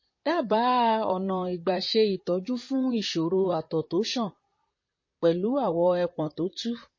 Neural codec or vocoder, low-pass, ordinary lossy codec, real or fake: vocoder, 44.1 kHz, 128 mel bands, Pupu-Vocoder; 7.2 kHz; MP3, 32 kbps; fake